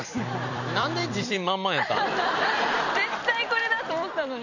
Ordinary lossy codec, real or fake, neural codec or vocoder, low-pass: none; fake; vocoder, 44.1 kHz, 128 mel bands every 256 samples, BigVGAN v2; 7.2 kHz